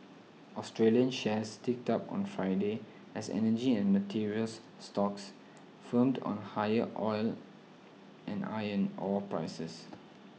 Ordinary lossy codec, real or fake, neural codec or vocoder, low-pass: none; real; none; none